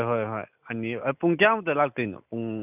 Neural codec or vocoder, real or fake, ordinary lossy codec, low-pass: none; real; none; 3.6 kHz